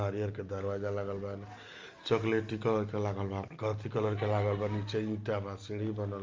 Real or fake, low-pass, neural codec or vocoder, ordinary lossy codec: real; 7.2 kHz; none; Opus, 32 kbps